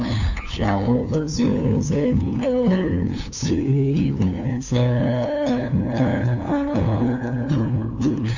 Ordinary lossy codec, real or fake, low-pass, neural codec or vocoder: none; fake; 7.2 kHz; codec, 16 kHz, 2 kbps, FunCodec, trained on LibriTTS, 25 frames a second